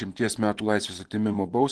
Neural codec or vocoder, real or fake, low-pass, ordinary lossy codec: vocoder, 24 kHz, 100 mel bands, Vocos; fake; 10.8 kHz; Opus, 16 kbps